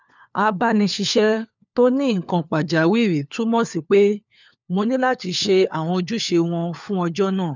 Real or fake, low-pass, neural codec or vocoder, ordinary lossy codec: fake; 7.2 kHz; codec, 16 kHz, 4 kbps, FunCodec, trained on LibriTTS, 50 frames a second; none